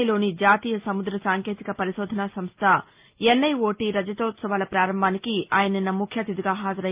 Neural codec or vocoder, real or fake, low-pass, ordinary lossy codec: none; real; 3.6 kHz; Opus, 24 kbps